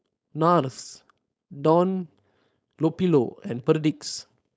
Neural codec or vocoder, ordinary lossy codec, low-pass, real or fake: codec, 16 kHz, 4.8 kbps, FACodec; none; none; fake